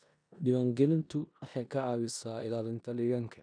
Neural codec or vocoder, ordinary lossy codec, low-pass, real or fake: codec, 16 kHz in and 24 kHz out, 0.9 kbps, LongCat-Audio-Codec, four codebook decoder; none; 9.9 kHz; fake